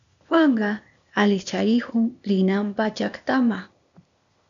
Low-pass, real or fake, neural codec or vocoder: 7.2 kHz; fake; codec, 16 kHz, 0.8 kbps, ZipCodec